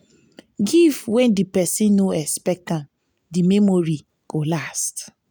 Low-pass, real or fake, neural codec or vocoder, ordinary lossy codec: none; real; none; none